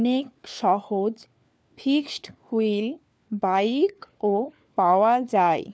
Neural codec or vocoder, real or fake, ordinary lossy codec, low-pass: codec, 16 kHz, 4 kbps, FunCodec, trained on LibriTTS, 50 frames a second; fake; none; none